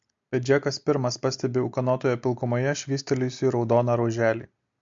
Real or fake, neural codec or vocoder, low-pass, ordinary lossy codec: real; none; 7.2 kHz; MP3, 48 kbps